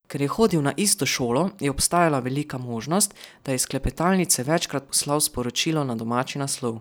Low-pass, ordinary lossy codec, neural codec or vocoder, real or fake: none; none; none; real